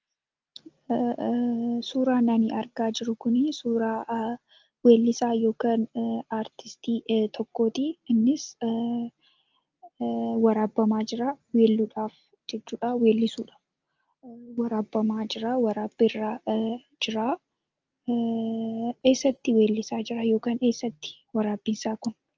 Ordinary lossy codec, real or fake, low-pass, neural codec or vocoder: Opus, 24 kbps; real; 7.2 kHz; none